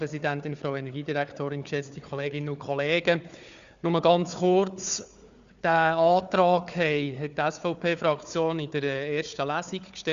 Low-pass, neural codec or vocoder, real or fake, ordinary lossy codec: 7.2 kHz; codec, 16 kHz, 4 kbps, FunCodec, trained on Chinese and English, 50 frames a second; fake; Opus, 64 kbps